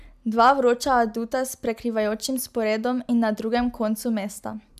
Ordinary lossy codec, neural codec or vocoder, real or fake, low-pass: AAC, 96 kbps; none; real; 14.4 kHz